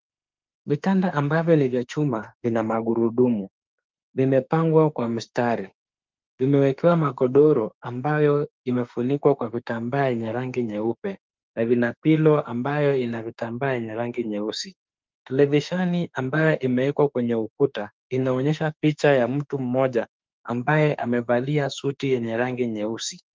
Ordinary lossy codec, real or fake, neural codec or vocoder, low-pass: Opus, 24 kbps; fake; autoencoder, 48 kHz, 32 numbers a frame, DAC-VAE, trained on Japanese speech; 7.2 kHz